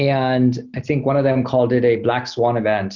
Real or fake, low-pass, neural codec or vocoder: real; 7.2 kHz; none